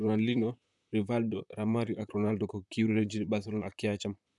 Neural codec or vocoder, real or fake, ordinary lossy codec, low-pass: vocoder, 44.1 kHz, 128 mel bands, Pupu-Vocoder; fake; none; 10.8 kHz